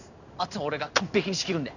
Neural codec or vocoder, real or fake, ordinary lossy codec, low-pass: codec, 16 kHz in and 24 kHz out, 1 kbps, XY-Tokenizer; fake; none; 7.2 kHz